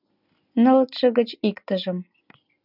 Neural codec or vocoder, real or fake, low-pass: none; real; 5.4 kHz